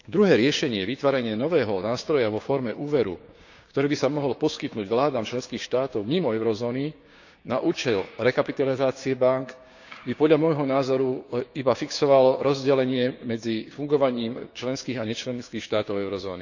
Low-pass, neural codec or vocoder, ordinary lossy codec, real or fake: 7.2 kHz; codec, 16 kHz, 6 kbps, DAC; none; fake